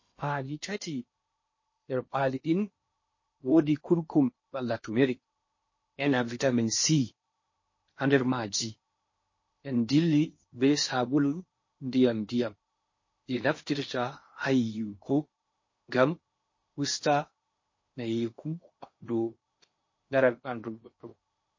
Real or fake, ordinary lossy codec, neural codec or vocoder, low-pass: fake; MP3, 32 kbps; codec, 16 kHz in and 24 kHz out, 0.8 kbps, FocalCodec, streaming, 65536 codes; 7.2 kHz